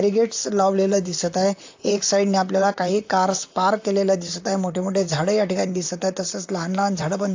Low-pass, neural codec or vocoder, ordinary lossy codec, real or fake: 7.2 kHz; vocoder, 44.1 kHz, 128 mel bands, Pupu-Vocoder; AAC, 48 kbps; fake